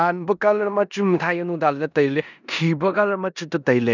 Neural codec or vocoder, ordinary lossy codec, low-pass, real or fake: codec, 16 kHz in and 24 kHz out, 0.9 kbps, LongCat-Audio-Codec, four codebook decoder; none; 7.2 kHz; fake